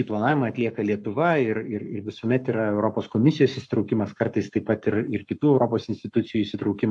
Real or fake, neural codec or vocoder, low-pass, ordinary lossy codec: fake; codec, 44.1 kHz, 7.8 kbps, Pupu-Codec; 10.8 kHz; AAC, 64 kbps